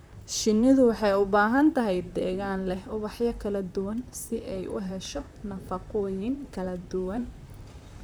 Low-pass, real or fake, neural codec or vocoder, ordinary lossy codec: none; fake; vocoder, 44.1 kHz, 128 mel bands, Pupu-Vocoder; none